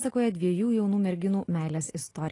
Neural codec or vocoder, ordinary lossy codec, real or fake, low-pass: none; AAC, 32 kbps; real; 10.8 kHz